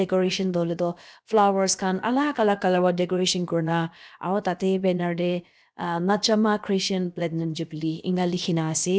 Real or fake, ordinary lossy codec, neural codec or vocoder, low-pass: fake; none; codec, 16 kHz, about 1 kbps, DyCAST, with the encoder's durations; none